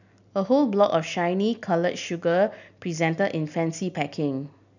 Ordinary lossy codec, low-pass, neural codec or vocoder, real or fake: none; 7.2 kHz; none; real